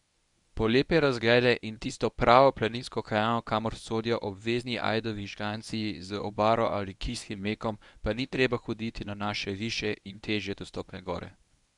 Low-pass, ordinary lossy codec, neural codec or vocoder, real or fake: 10.8 kHz; none; codec, 24 kHz, 0.9 kbps, WavTokenizer, medium speech release version 1; fake